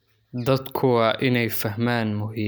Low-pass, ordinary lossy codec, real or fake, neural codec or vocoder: none; none; real; none